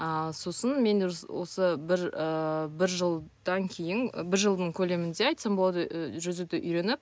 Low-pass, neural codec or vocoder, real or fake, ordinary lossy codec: none; none; real; none